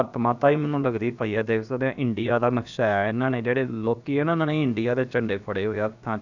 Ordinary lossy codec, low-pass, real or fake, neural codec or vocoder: none; 7.2 kHz; fake; codec, 16 kHz, about 1 kbps, DyCAST, with the encoder's durations